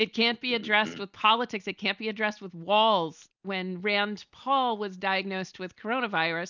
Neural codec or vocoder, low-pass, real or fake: none; 7.2 kHz; real